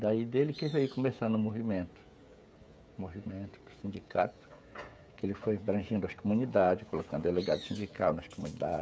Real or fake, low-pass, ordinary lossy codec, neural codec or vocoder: fake; none; none; codec, 16 kHz, 16 kbps, FreqCodec, smaller model